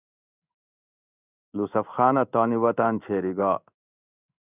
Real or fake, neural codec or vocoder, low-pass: fake; codec, 16 kHz in and 24 kHz out, 1 kbps, XY-Tokenizer; 3.6 kHz